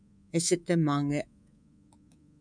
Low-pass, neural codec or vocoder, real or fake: 9.9 kHz; codec, 24 kHz, 3.1 kbps, DualCodec; fake